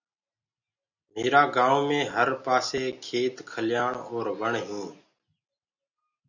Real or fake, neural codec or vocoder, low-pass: real; none; 7.2 kHz